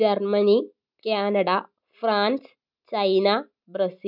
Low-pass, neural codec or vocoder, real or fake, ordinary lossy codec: 5.4 kHz; none; real; none